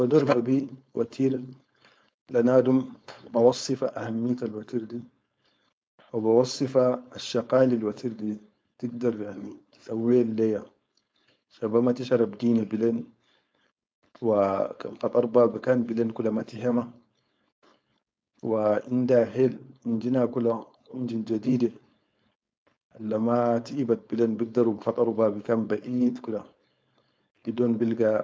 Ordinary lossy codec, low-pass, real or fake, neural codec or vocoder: none; none; fake; codec, 16 kHz, 4.8 kbps, FACodec